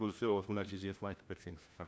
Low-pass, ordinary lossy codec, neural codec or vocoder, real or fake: none; none; codec, 16 kHz, 1 kbps, FunCodec, trained on LibriTTS, 50 frames a second; fake